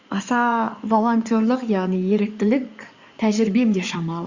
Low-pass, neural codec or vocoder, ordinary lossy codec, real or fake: 7.2 kHz; codec, 16 kHz in and 24 kHz out, 2.2 kbps, FireRedTTS-2 codec; Opus, 64 kbps; fake